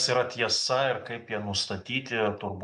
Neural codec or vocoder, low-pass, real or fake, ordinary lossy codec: none; 10.8 kHz; real; MP3, 96 kbps